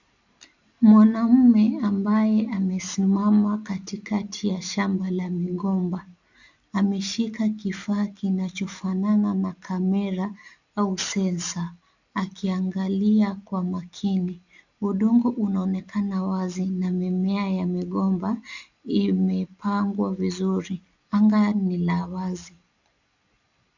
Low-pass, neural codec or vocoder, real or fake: 7.2 kHz; none; real